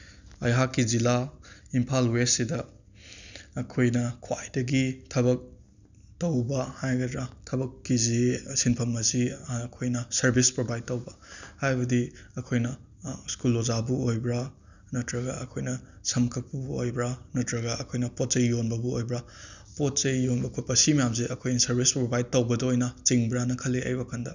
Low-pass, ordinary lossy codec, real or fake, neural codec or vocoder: 7.2 kHz; none; real; none